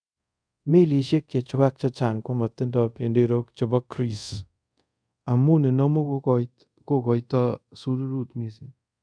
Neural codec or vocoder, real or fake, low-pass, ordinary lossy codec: codec, 24 kHz, 0.5 kbps, DualCodec; fake; 9.9 kHz; none